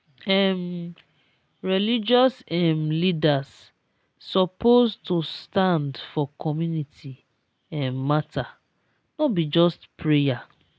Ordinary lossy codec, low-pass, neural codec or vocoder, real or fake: none; none; none; real